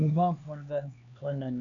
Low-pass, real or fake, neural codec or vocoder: 7.2 kHz; fake; codec, 16 kHz, 2 kbps, X-Codec, HuBERT features, trained on LibriSpeech